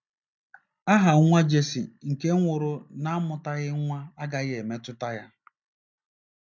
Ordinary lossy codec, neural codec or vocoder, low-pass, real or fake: none; none; 7.2 kHz; real